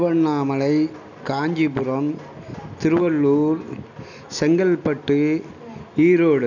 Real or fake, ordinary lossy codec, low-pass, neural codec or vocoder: real; none; 7.2 kHz; none